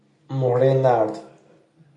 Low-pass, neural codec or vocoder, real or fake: 10.8 kHz; none; real